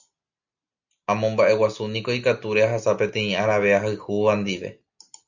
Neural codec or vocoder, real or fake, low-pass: none; real; 7.2 kHz